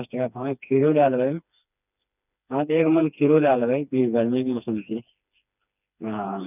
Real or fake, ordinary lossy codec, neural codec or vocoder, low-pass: fake; none; codec, 16 kHz, 2 kbps, FreqCodec, smaller model; 3.6 kHz